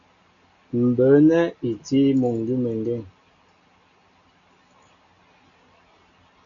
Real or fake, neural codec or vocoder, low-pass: real; none; 7.2 kHz